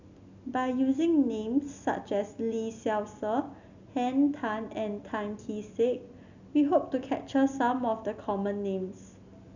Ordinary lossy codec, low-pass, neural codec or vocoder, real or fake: none; 7.2 kHz; none; real